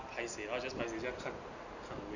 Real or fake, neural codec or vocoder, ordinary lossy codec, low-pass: real; none; none; 7.2 kHz